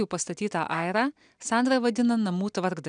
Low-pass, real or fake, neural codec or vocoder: 9.9 kHz; real; none